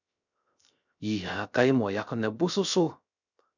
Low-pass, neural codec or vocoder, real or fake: 7.2 kHz; codec, 16 kHz, 0.3 kbps, FocalCodec; fake